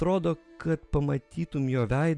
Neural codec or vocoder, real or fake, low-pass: none; real; 10.8 kHz